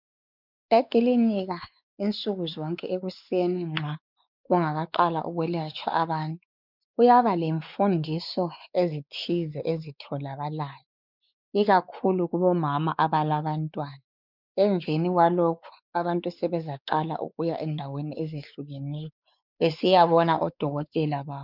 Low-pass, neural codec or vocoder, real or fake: 5.4 kHz; codec, 16 kHz, 4 kbps, X-Codec, WavLM features, trained on Multilingual LibriSpeech; fake